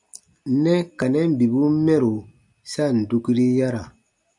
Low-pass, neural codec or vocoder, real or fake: 10.8 kHz; none; real